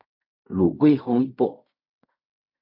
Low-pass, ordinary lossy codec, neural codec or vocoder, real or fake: 5.4 kHz; MP3, 48 kbps; codec, 16 kHz in and 24 kHz out, 0.4 kbps, LongCat-Audio-Codec, fine tuned four codebook decoder; fake